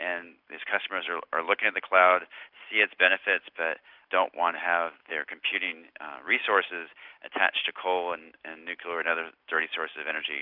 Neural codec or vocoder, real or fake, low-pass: none; real; 5.4 kHz